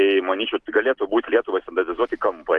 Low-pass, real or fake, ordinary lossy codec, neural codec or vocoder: 10.8 kHz; real; Opus, 16 kbps; none